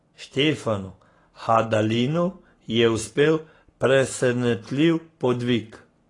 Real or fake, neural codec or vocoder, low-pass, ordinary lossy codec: fake; codec, 44.1 kHz, 7.8 kbps, Pupu-Codec; 10.8 kHz; AAC, 32 kbps